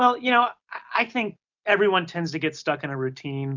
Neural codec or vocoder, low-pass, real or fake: none; 7.2 kHz; real